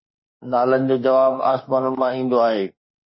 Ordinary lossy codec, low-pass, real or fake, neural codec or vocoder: MP3, 24 kbps; 7.2 kHz; fake; autoencoder, 48 kHz, 32 numbers a frame, DAC-VAE, trained on Japanese speech